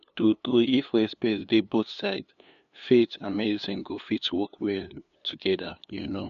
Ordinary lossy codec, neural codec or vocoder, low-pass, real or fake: MP3, 96 kbps; codec, 16 kHz, 2 kbps, FunCodec, trained on LibriTTS, 25 frames a second; 7.2 kHz; fake